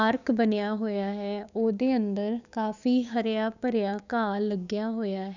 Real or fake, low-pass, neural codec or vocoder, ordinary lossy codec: fake; 7.2 kHz; codec, 16 kHz, 6 kbps, DAC; none